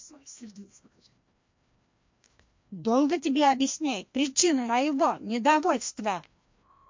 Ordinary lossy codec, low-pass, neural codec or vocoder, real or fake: MP3, 48 kbps; 7.2 kHz; codec, 16 kHz, 1 kbps, FreqCodec, larger model; fake